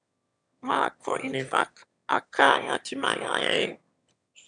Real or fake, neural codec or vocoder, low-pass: fake; autoencoder, 22.05 kHz, a latent of 192 numbers a frame, VITS, trained on one speaker; 9.9 kHz